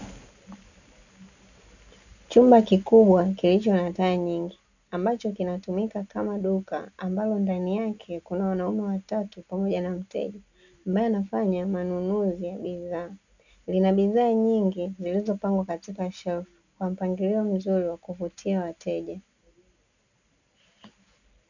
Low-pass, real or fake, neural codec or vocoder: 7.2 kHz; real; none